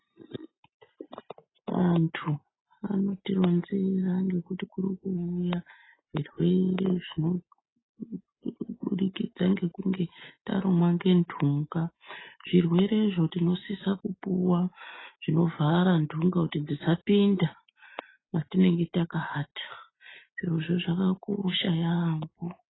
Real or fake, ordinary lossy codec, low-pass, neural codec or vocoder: real; AAC, 16 kbps; 7.2 kHz; none